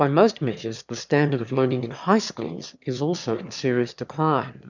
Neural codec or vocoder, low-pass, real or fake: autoencoder, 22.05 kHz, a latent of 192 numbers a frame, VITS, trained on one speaker; 7.2 kHz; fake